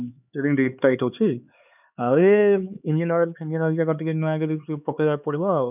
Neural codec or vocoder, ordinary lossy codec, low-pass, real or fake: codec, 16 kHz, 4 kbps, X-Codec, HuBERT features, trained on LibriSpeech; none; 3.6 kHz; fake